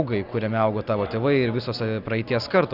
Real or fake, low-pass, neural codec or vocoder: real; 5.4 kHz; none